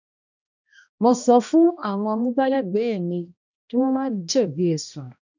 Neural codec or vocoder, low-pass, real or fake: codec, 16 kHz, 1 kbps, X-Codec, HuBERT features, trained on balanced general audio; 7.2 kHz; fake